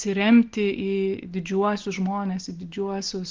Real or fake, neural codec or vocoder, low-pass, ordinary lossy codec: real; none; 7.2 kHz; Opus, 16 kbps